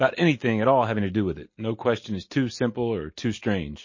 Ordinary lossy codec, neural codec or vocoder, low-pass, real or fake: MP3, 32 kbps; none; 7.2 kHz; real